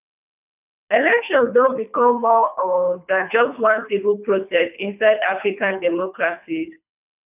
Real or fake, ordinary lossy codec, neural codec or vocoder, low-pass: fake; none; codec, 24 kHz, 3 kbps, HILCodec; 3.6 kHz